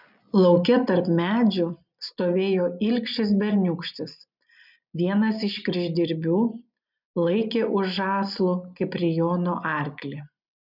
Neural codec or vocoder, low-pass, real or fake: none; 5.4 kHz; real